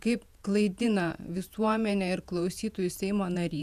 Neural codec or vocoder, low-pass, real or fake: vocoder, 48 kHz, 128 mel bands, Vocos; 14.4 kHz; fake